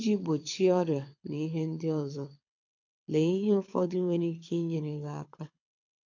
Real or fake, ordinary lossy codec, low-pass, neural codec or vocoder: fake; MP3, 48 kbps; 7.2 kHz; codec, 24 kHz, 6 kbps, HILCodec